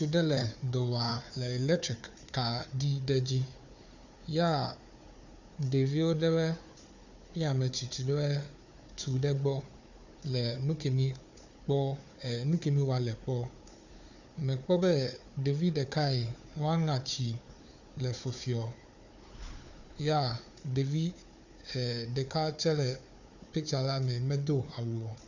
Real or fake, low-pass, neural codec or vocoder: fake; 7.2 kHz; codec, 16 kHz, 4 kbps, FunCodec, trained on Chinese and English, 50 frames a second